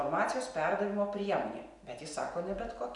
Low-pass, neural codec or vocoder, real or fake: 10.8 kHz; none; real